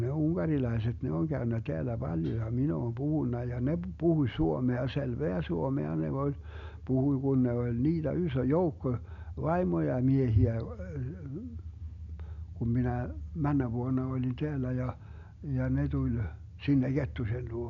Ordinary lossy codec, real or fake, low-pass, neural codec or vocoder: none; real; 7.2 kHz; none